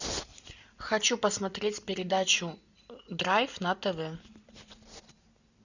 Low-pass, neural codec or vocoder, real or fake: 7.2 kHz; none; real